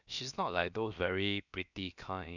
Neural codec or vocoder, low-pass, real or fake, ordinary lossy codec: codec, 16 kHz, about 1 kbps, DyCAST, with the encoder's durations; 7.2 kHz; fake; none